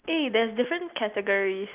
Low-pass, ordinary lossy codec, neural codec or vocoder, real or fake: 3.6 kHz; Opus, 24 kbps; none; real